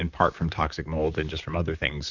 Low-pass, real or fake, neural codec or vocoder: 7.2 kHz; fake; vocoder, 44.1 kHz, 128 mel bands, Pupu-Vocoder